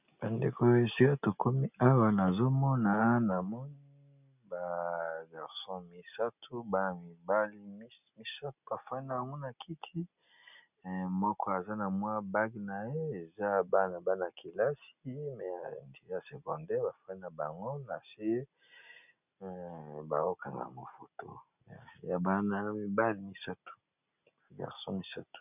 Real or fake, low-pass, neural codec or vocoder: real; 3.6 kHz; none